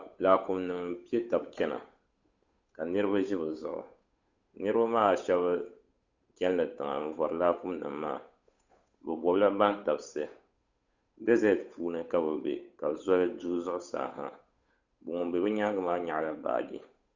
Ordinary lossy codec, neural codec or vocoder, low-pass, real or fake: Opus, 64 kbps; codec, 16 kHz, 8 kbps, FunCodec, trained on Chinese and English, 25 frames a second; 7.2 kHz; fake